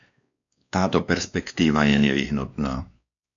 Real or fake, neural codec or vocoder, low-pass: fake; codec, 16 kHz, 2 kbps, X-Codec, WavLM features, trained on Multilingual LibriSpeech; 7.2 kHz